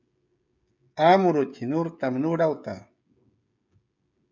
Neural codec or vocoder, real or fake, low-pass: codec, 16 kHz, 16 kbps, FreqCodec, smaller model; fake; 7.2 kHz